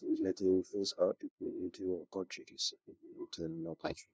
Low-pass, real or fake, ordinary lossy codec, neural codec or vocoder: none; fake; none; codec, 16 kHz, 0.5 kbps, FunCodec, trained on LibriTTS, 25 frames a second